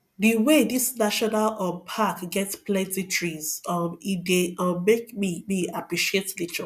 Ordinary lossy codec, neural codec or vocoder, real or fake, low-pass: none; none; real; 14.4 kHz